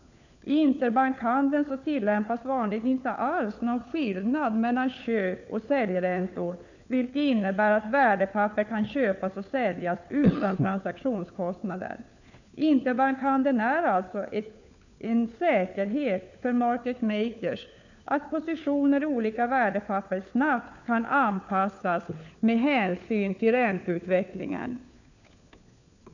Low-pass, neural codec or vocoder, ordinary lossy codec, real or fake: 7.2 kHz; codec, 16 kHz, 4 kbps, FunCodec, trained on LibriTTS, 50 frames a second; none; fake